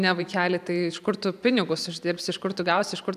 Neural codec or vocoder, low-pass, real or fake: vocoder, 44.1 kHz, 128 mel bands every 512 samples, BigVGAN v2; 14.4 kHz; fake